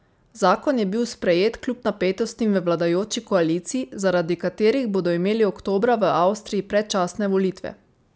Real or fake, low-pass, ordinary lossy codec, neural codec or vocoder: real; none; none; none